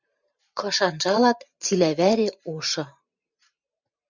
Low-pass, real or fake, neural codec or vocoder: 7.2 kHz; fake; vocoder, 44.1 kHz, 128 mel bands every 512 samples, BigVGAN v2